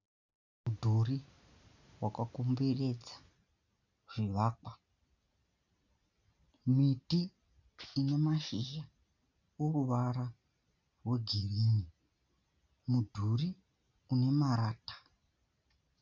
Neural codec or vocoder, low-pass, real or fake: none; 7.2 kHz; real